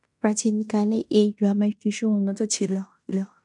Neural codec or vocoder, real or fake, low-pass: codec, 16 kHz in and 24 kHz out, 0.9 kbps, LongCat-Audio-Codec, fine tuned four codebook decoder; fake; 10.8 kHz